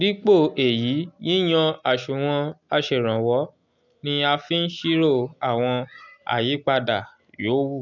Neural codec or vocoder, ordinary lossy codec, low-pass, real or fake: none; none; 7.2 kHz; real